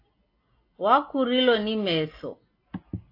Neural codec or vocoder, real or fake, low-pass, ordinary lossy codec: none; real; 5.4 kHz; AAC, 32 kbps